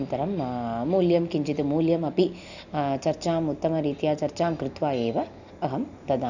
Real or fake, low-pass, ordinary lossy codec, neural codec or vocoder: real; 7.2 kHz; none; none